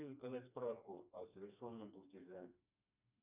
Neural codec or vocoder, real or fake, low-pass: codec, 16 kHz, 2 kbps, FreqCodec, smaller model; fake; 3.6 kHz